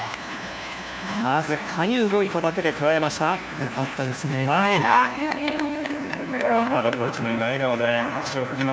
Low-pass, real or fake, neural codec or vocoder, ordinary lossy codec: none; fake; codec, 16 kHz, 1 kbps, FunCodec, trained on LibriTTS, 50 frames a second; none